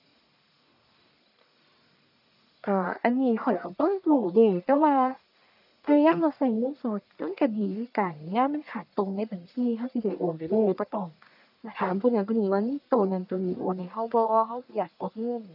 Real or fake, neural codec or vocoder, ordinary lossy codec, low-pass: fake; codec, 44.1 kHz, 1.7 kbps, Pupu-Codec; none; 5.4 kHz